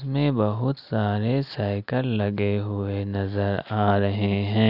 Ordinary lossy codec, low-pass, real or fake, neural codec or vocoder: none; 5.4 kHz; real; none